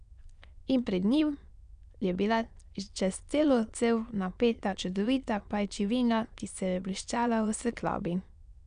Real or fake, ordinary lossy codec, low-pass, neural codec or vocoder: fake; none; 9.9 kHz; autoencoder, 22.05 kHz, a latent of 192 numbers a frame, VITS, trained on many speakers